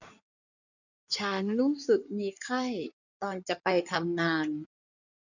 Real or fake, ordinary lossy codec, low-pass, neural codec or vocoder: fake; none; 7.2 kHz; codec, 16 kHz in and 24 kHz out, 2.2 kbps, FireRedTTS-2 codec